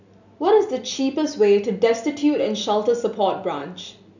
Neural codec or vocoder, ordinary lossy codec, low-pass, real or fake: none; none; 7.2 kHz; real